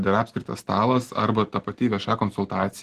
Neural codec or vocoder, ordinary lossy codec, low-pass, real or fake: none; Opus, 16 kbps; 14.4 kHz; real